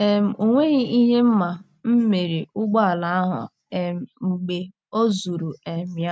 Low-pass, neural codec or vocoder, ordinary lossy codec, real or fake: none; none; none; real